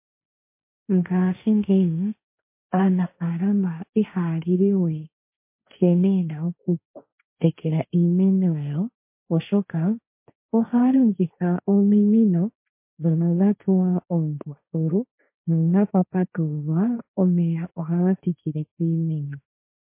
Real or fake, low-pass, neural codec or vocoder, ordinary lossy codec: fake; 3.6 kHz; codec, 16 kHz, 1.1 kbps, Voila-Tokenizer; MP3, 24 kbps